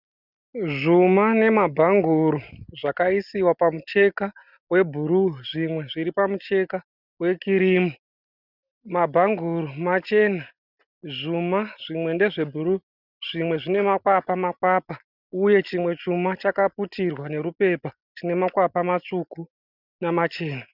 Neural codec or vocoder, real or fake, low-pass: none; real; 5.4 kHz